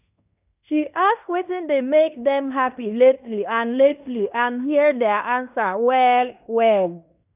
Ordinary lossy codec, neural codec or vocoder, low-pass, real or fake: none; codec, 16 kHz in and 24 kHz out, 0.9 kbps, LongCat-Audio-Codec, fine tuned four codebook decoder; 3.6 kHz; fake